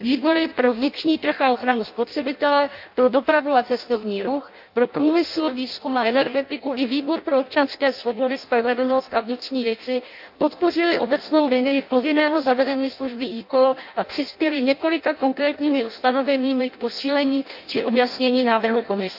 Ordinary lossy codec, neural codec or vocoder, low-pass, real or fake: MP3, 48 kbps; codec, 16 kHz in and 24 kHz out, 0.6 kbps, FireRedTTS-2 codec; 5.4 kHz; fake